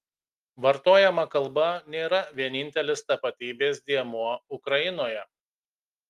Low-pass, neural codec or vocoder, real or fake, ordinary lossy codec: 14.4 kHz; none; real; Opus, 32 kbps